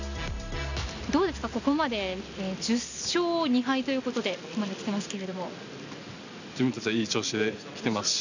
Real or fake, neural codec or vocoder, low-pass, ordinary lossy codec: real; none; 7.2 kHz; none